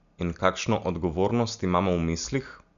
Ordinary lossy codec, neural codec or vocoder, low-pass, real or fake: none; none; 7.2 kHz; real